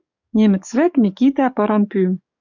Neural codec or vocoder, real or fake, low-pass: codec, 44.1 kHz, 7.8 kbps, DAC; fake; 7.2 kHz